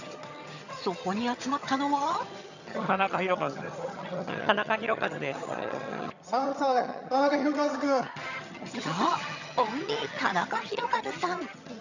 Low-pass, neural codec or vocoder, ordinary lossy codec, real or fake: 7.2 kHz; vocoder, 22.05 kHz, 80 mel bands, HiFi-GAN; none; fake